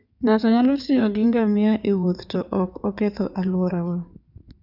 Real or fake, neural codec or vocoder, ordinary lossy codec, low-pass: fake; codec, 16 kHz in and 24 kHz out, 2.2 kbps, FireRedTTS-2 codec; none; 5.4 kHz